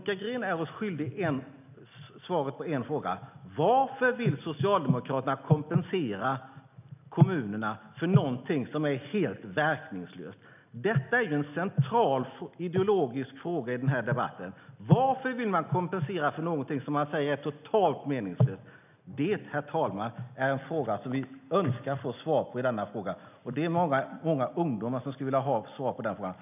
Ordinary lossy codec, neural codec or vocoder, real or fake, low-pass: none; none; real; 3.6 kHz